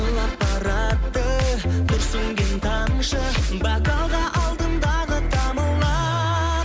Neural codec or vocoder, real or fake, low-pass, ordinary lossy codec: none; real; none; none